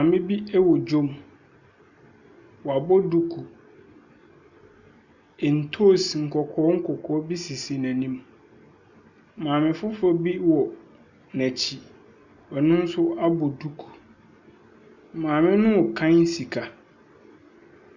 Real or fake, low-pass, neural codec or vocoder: real; 7.2 kHz; none